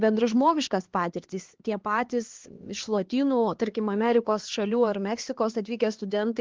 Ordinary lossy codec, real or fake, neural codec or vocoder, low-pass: Opus, 16 kbps; fake; codec, 16 kHz, 2 kbps, X-Codec, HuBERT features, trained on LibriSpeech; 7.2 kHz